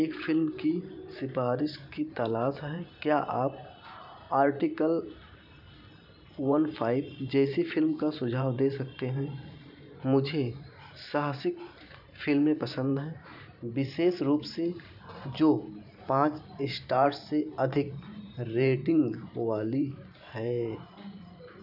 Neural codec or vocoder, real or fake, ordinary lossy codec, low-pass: vocoder, 44.1 kHz, 128 mel bands every 512 samples, BigVGAN v2; fake; none; 5.4 kHz